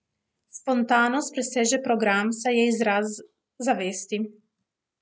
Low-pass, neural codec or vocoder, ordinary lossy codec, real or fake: none; none; none; real